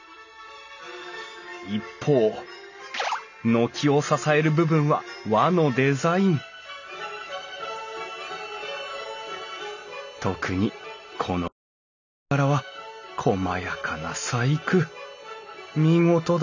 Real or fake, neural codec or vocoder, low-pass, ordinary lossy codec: real; none; 7.2 kHz; none